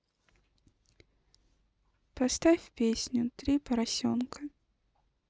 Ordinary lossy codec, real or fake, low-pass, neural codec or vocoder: none; real; none; none